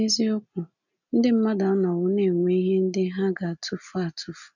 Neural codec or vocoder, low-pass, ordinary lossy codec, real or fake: none; 7.2 kHz; none; real